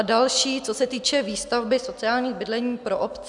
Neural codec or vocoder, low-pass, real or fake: none; 10.8 kHz; real